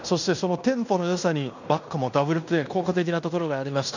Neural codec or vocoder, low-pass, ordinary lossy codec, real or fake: codec, 16 kHz in and 24 kHz out, 0.9 kbps, LongCat-Audio-Codec, fine tuned four codebook decoder; 7.2 kHz; none; fake